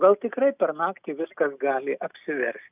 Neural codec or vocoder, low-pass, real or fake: none; 3.6 kHz; real